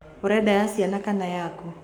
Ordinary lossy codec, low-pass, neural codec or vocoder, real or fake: none; 19.8 kHz; codec, 44.1 kHz, 7.8 kbps, Pupu-Codec; fake